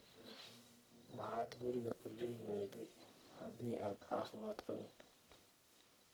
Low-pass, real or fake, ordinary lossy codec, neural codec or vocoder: none; fake; none; codec, 44.1 kHz, 1.7 kbps, Pupu-Codec